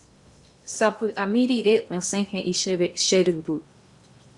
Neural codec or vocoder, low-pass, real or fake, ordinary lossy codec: codec, 16 kHz in and 24 kHz out, 0.8 kbps, FocalCodec, streaming, 65536 codes; 10.8 kHz; fake; Opus, 64 kbps